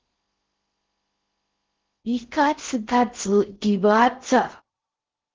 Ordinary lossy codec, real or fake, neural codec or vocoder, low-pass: Opus, 16 kbps; fake; codec, 16 kHz in and 24 kHz out, 0.6 kbps, FocalCodec, streaming, 4096 codes; 7.2 kHz